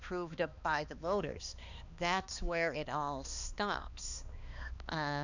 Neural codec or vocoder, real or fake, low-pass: codec, 16 kHz, 2 kbps, X-Codec, HuBERT features, trained on balanced general audio; fake; 7.2 kHz